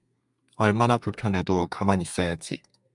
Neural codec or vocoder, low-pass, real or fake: codec, 32 kHz, 1.9 kbps, SNAC; 10.8 kHz; fake